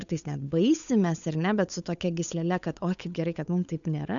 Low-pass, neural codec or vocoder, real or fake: 7.2 kHz; codec, 16 kHz, 8 kbps, FunCodec, trained on Chinese and English, 25 frames a second; fake